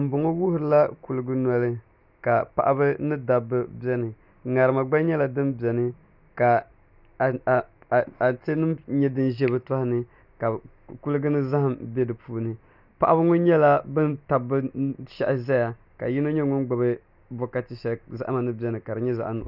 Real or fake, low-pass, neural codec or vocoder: real; 5.4 kHz; none